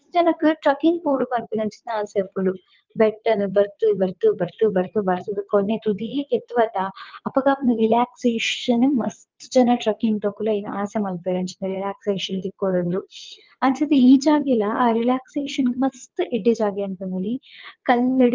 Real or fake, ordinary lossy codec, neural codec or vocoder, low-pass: fake; Opus, 32 kbps; vocoder, 22.05 kHz, 80 mel bands, WaveNeXt; 7.2 kHz